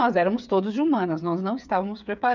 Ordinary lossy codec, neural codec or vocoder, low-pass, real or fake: none; codec, 16 kHz, 8 kbps, FreqCodec, smaller model; 7.2 kHz; fake